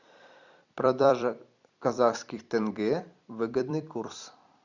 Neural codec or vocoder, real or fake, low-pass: vocoder, 44.1 kHz, 128 mel bands every 256 samples, BigVGAN v2; fake; 7.2 kHz